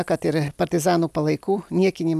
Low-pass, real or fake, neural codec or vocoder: 14.4 kHz; real; none